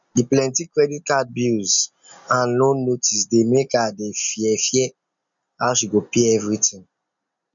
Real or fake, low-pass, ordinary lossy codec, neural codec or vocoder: real; 7.2 kHz; none; none